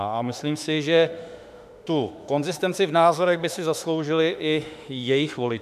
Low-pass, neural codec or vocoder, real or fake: 14.4 kHz; autoencoder, 48 kHz, 32 numbers a frame, DAC-VAE, trained on Japanese speech; fake